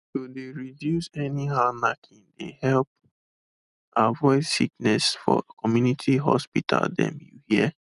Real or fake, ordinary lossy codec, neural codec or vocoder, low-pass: real; none; none; 10.8 kHz